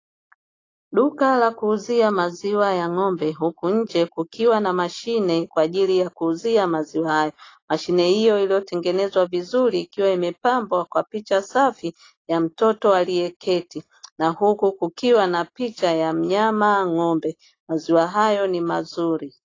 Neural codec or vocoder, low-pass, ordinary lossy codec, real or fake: none; 7.2 kHz; AAC, 32 kbps; real